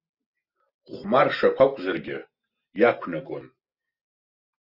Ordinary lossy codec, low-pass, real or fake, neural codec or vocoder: Opus, 64 kbps; 5.4 kHz; real; none